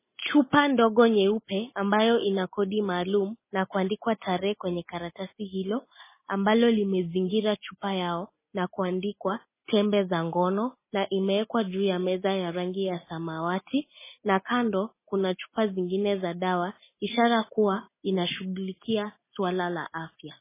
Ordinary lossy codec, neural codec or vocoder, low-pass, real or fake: MP3, 16 kbps; none; 3.6 kHz; real